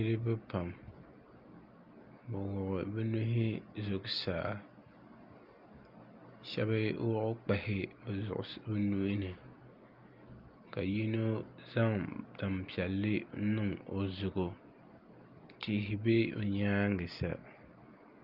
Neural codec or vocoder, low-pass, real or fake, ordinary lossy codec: none; 5.4 kHz; real; Opus, 32 kbps